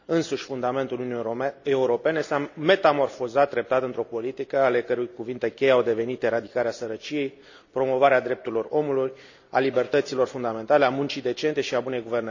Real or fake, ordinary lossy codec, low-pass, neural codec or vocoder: real; none; 7.2 kHz; none